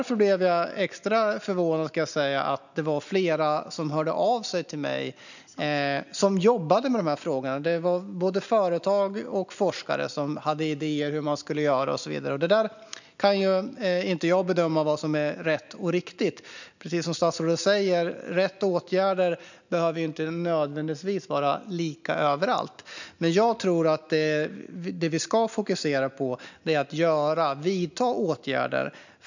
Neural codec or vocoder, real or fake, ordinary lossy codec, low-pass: none; real; none; 7.2 kHz